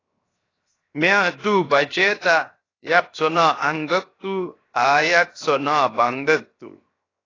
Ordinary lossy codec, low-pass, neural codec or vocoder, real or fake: AAC, 32 kbps; 7.2 kHz; codec, 16 kHz, 0.7 kbps, FocalCodec; fake